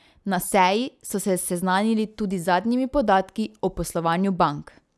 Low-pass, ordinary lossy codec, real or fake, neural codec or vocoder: none; none; real; none